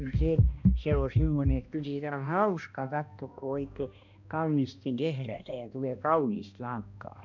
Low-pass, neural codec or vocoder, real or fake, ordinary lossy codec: 7.2 kHz; codec, 16 kHz, 1 kbps, X-Codec, HuBERT features, trained on balanced general audio; fake; none